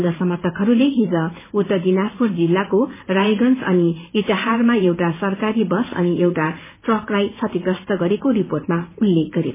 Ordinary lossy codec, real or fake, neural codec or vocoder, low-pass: MP3, 16 kbps; fake; vocoder, 44.1 kHz, 128 mel bands every 512 samples, BigVGAN v2; 3.6 kHz